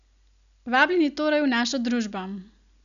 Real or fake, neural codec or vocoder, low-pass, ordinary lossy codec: real; none; 7.2 kHz; none